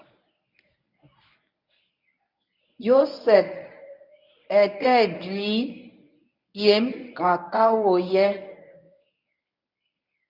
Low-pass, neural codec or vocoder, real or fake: 5.4 kHz; codec, 24 kHz, 0.9 kbps, WavTokenizer, medium speech release version 1; fake